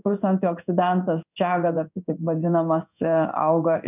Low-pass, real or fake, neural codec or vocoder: 3.6 kHz; fake; codec, 16 kHz in and 24 kHz out, 1 kbps, XY-Tokenizer